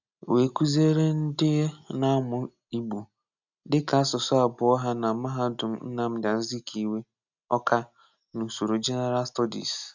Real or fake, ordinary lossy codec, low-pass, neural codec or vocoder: real; none; 7.2 kHz; none